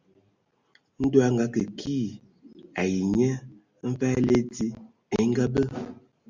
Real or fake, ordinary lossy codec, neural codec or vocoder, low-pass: real; Opus, 64 kbps; none; 7.2 kHz